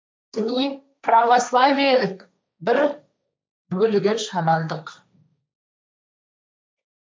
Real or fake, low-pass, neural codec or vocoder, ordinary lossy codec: fake; none; codec, 16 kHz, 1.1 kbps, Voila-Tokenizer; none